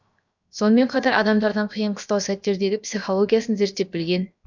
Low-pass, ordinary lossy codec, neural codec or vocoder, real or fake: 7.2 kHz; none; codec, 16 kHz, 0.7 kbps, FocalCodec; fake